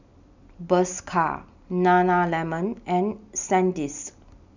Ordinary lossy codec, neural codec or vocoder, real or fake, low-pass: none; none; real; 7.2 kHz